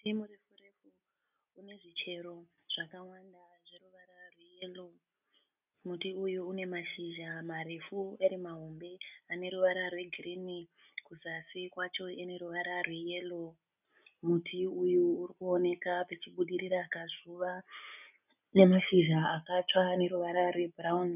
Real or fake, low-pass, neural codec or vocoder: real; 3.6 kHz; none